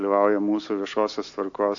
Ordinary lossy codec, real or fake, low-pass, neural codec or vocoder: AAC, 48 kbps; real; 7.2 kHz; none